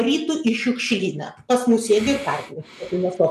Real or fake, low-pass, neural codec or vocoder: real; 14.4 kHz; none